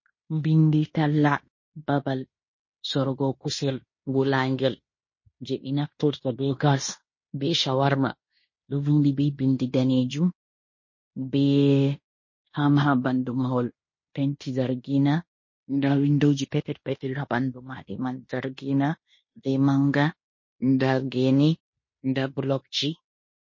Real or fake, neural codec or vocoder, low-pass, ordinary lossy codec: fake; codec, 16 kHz in and 24 kHz out, 0.9 kbps, LongCat-Audio-Codec, fine tuned four codebook decoder; 7.2 kHz; MP3, 32 kbps